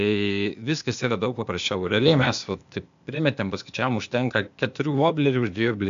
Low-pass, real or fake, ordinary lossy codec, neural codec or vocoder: 7.2 kHz; fake; MP3, 64 kbps; codec, 16 kHz, 0.8 kbps, ZipCodec